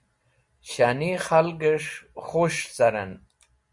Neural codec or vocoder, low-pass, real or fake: none; 10.8 kHz; real